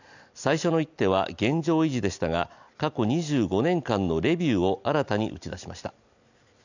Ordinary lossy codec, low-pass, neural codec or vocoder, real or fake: none; 7.2 kHz; none; real